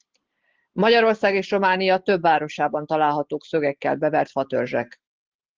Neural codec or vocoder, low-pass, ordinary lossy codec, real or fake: none; 7.2 kHz; Opus, 16 kbps; real